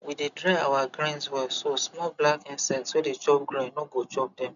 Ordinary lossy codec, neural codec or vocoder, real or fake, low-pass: MP3, 96 kbps; none; real; 7.2 kHz